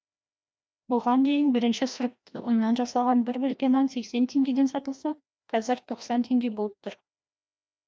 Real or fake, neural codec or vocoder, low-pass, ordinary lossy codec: fake; codec, 16 kHz, 1 kbps, FreqCodec, larger model; none; none